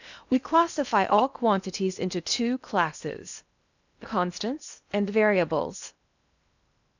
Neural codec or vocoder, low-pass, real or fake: codec, 16 kHz in and 24 kHz out, 0.8 kbps, FocalCodec, streaming, 65536 codes; 7.2 kHz; fake